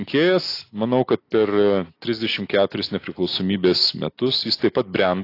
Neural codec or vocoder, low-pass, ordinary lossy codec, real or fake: none; 5.4 kHz; AAC, 32 kbps; real